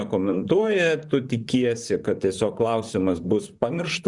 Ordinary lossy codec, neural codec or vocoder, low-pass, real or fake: Opus, 64 kbps; vocoder, 44.1 kHz, 128 mel bands, Pupu-Vocoder; 10.8 kHz; fake